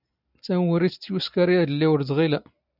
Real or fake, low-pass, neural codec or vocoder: real; 5.4 kHz; none